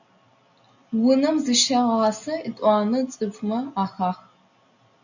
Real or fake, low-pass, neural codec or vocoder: real; 7.2 kHz; none